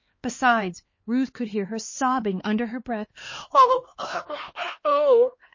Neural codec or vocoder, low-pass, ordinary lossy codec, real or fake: codec, 16 kHz, 2 kbps, X-Codec, HuBERT features, trained on LibriSpeech; 7.2 kHz; MP3, 32 kbps; fake